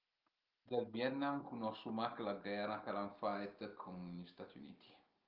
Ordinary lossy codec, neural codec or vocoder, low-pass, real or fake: Opus, 32 kbps; none; 5.4 kHz; real